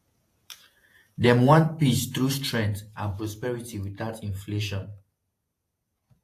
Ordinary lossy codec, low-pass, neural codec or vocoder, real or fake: AAC, 64 kbps; 14.4 kHz; vocoder, 48 kHz, 128 mel bands, Vocos; fake